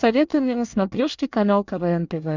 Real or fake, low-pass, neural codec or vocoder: fake; 7.2 kHz; codec, 24 kHz, 1 kbps, SNAC